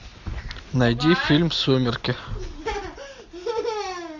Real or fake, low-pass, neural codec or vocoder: real; 7.2 kHz; none